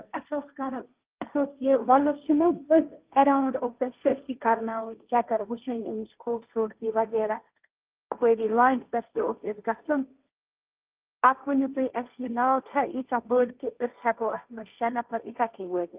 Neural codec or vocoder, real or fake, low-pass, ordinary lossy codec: codec, 16 kHz, 1.1 kbps, Voila-Tokenizer; fake; 3.6 kHz; Opus, 24 kbps